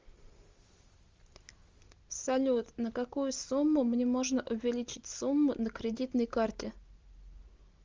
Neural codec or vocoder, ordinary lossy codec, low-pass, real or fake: none; Opus, 32 kbps; 7.2 kHz; real